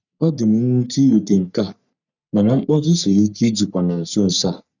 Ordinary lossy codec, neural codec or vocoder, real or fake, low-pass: none; codec, 44.1 kHz, 3.4 kbps, Pupu-Codec; fake; 7.2 kHz